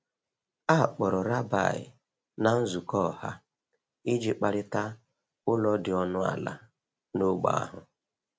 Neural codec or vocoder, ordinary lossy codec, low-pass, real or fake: none; none; none; real